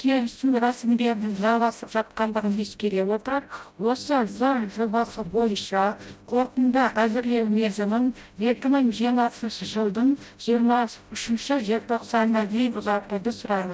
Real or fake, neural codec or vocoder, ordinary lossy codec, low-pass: fake; codec, 16 kHz, 0.5 kbps, FreqCodec, smaller model; none; none